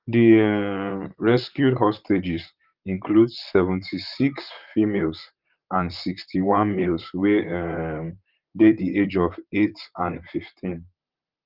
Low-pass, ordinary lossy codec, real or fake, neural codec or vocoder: 5.4 kHz; Opus, 32 kbps; fake; vocoder, 44.1 kHz, 128 mel bands, Pupu-Vocoder